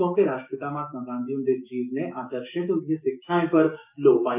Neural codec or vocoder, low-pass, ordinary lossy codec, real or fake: codec, 16 kHz, 6 kbps, DAC; 3.6 kHz; none; fake